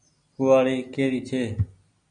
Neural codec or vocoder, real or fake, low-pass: none; real; 9.9 kHz